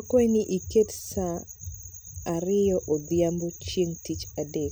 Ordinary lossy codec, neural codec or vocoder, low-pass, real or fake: none; none; none; real